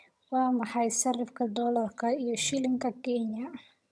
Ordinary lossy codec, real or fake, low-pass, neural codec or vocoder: none; fake; none; vocoder, 22.05 kHz, 80 mel bands, HiFi-GAN